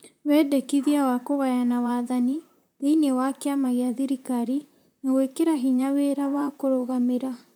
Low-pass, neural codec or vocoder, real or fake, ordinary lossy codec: none; vocoder, 44.1 kHz, 128 mel bands, Pupu-Vocoder; fake; none